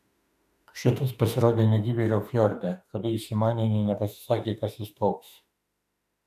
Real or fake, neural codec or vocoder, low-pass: fake; autoencoder, 48 kHz, 32 numbers a frame, DAC-VAE, trained on Japanese speech; 14.4 kHz